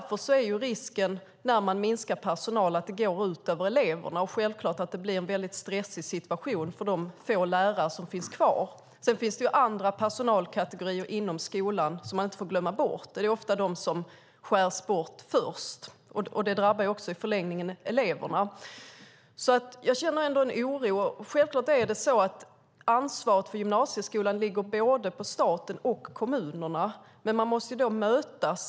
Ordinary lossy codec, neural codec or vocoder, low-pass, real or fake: none; none; none; real